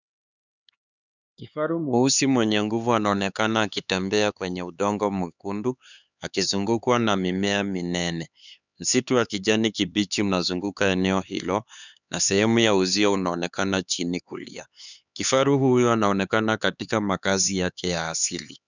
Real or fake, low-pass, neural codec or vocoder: fake; 7.2 kHz; codec, 16 kHz, 4 kbps, X-Codec, HuBERT features, trained on LibriSpeech